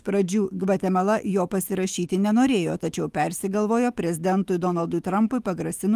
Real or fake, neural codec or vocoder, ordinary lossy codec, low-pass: fake; autoencoder, 48 kHz, 128 numbers a frame, DAC-VAE, trained on Japanese speech; Opus, 24 kbps; 14.4 kHz